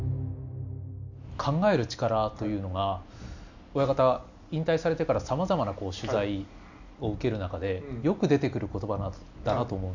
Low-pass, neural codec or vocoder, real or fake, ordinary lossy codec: 7.2 kHz; none; real; none